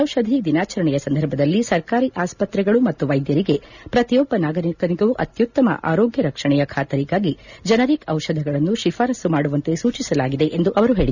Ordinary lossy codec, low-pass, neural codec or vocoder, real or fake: none; 7.2 kHz; none; real